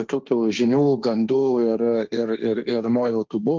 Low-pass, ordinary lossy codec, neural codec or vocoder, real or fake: 7.2 kHz; Opus, 24 kbps; codec, 16 kHz, 1.1 kbps, Voila-Tokenizer; fake